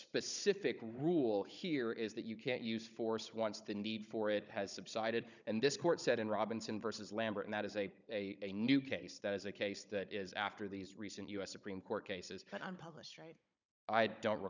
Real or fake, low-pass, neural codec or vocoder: fake; 7.2 kHz; codec, 16 kHz, 16 kbps, FunCodec, trained on Chinese and English, 50 frames a second